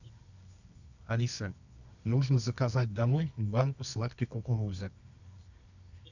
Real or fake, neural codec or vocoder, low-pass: fake; codec, 24 kHz, 0.9 kbps, WavTokenizer, medium music audio release; 7.2 kHz